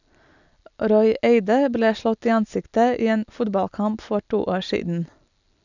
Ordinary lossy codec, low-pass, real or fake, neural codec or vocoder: none; 7.2 kHz; real; none